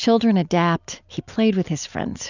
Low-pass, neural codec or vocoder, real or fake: 7.2 kHz; none; real